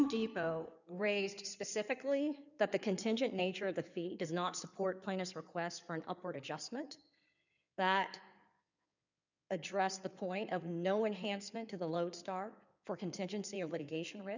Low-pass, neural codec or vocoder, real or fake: 7.2 kHz; codec, 16 kHz in and 24 kHz out, 2.2 kbps, FireRedTTS-2 codec; fake